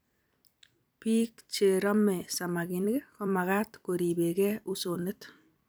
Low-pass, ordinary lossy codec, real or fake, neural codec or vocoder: none; none; fake; vocoder, 44.1 kHz, 128 mel bands every 256 samples, BigVGAN v2